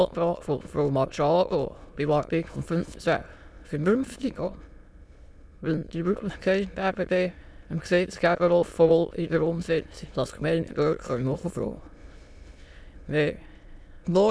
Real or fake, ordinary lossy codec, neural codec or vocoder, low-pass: fake; none; autoencoder, 22.05 kHz, a latent of 192 numbers a frame, VITS, trained on many speakers; none